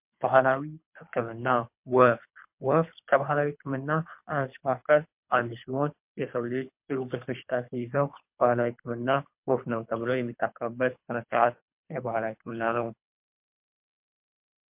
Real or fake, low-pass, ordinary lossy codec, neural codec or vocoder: fake; 3.6 kHz; MP3, 32 kbps; codec, 24 kHz, 3 kbps, HILCodec